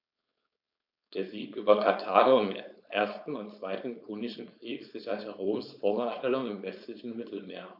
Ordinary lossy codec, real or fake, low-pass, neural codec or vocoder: none; fake; 5.4 kHz; codec, 16 kHz, 4.8 kbps, FACodec